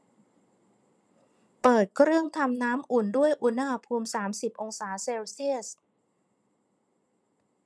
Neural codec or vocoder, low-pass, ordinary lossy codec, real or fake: vocoder, 22.05 kHz, 80 mel bands, Vocos; none; none; fake